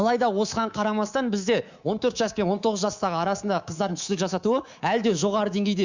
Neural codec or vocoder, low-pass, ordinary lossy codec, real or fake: codec, 24 kHz, 3.1 kbps, DualCodec; 7.2 kHz; Opus, 64 kbps; fake